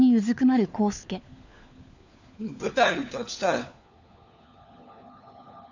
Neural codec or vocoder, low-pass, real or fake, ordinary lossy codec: codec, 16 kHz, 4 kbps, FunCodec, trained on LibriTTS, 50 frames a second; 7.2 kHz; fake; none